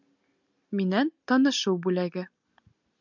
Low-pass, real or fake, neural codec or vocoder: 7.2 kHz; real; none